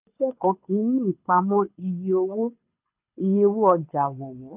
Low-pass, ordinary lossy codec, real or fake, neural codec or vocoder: 3.6 kHz; none; fake; codec, 24 kHz, 6 kbps, HILCodec